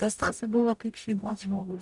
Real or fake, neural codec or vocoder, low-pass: fake; codec, 44.1 kHz, 0.9 kbps, DAC; 10.8 kHz